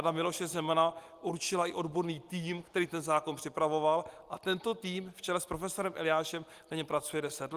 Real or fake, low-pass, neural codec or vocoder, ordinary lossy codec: real; 14.4 kHz; none; Opus, 24 kbps